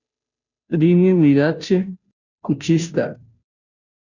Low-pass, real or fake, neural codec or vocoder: 7.2 kHz; fake; codec, 16 kHz, 0.5 kbps, FunCodec, trained on Chinese and English, 25 frames a second